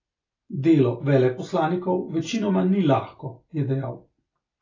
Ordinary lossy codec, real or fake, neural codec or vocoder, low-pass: AAC, 32 kbps; real; none; 7.2 kHz